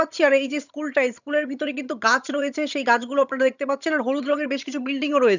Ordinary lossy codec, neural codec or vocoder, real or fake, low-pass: none; vocoder, 22.05 kHz, 80 mel bands, HiFi-GAN; fake; 7.2 kHz